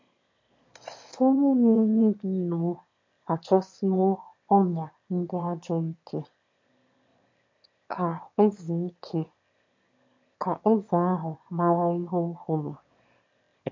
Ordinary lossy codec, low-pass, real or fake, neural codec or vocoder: MP3, 48 kbps; 7.2 kHz; fake; autoencoder, 22.05 kHz, a latent of 192 numbers a frame, VITS, trained on one speaker